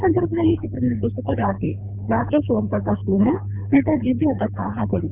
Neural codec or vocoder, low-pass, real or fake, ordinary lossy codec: codec, 24 kHz, 3 kbps, HILCodec; 3.6 kHz; fake; none